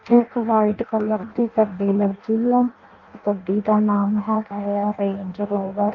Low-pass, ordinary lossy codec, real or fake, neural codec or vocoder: 7.2 kHz; Opus, 32 kbps; fake; codec, 16 kHz in and 24 kHz out, 0.6 kbps, FireRedTTS-2 codec